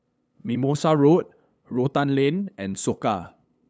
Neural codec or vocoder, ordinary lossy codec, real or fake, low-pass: codec, 16 kHz, 8 kbps, FunCodec, trained on LibriTTS, 25 frames a second; none; fake; none